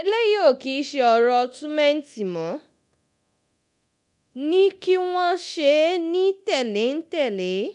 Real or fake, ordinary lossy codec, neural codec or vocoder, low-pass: fake; none; codec, 24 kHz, 0.9 kbps, DualCodec; 10.8 kHz